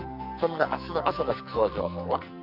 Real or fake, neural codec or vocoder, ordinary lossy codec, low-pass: fake; codec, 44.1 kHz, 2.6 kbps, SNAC; none; 5.4 kHz